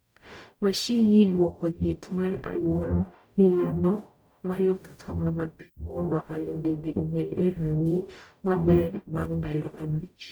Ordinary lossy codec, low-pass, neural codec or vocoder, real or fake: none; none; codec, 44.1 kHz, 0.9 kbps, DAC; fake